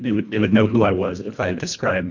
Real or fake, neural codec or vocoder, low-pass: fake; codec, 24 kHz, 1.5 kbps, HILCodec; 7.2 kHz